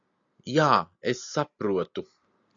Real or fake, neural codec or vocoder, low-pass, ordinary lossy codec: real; none; 7.2 kHz; AAC, 64 kbps